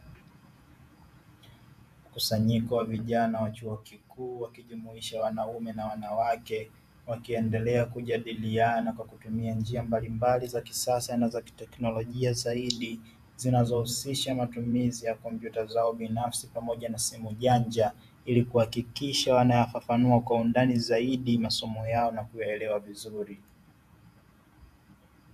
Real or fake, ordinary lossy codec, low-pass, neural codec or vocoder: fake; AAC, 96 kbps; 14.4 kHz; vocoder, 48 kHz, 128 mel bands, Vocos